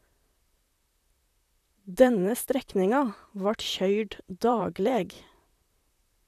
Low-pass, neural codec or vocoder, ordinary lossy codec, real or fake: 14.4 kHz; vocoder, 44.1 kHz, 128 mel bands, Pupu-Vocoder; none; fake